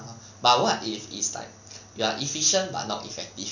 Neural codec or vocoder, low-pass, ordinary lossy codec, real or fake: none; 7.2 kHz; none; real